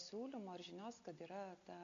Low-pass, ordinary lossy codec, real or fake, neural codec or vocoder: 7.2 kHz; MP3, 64 kbps; real; none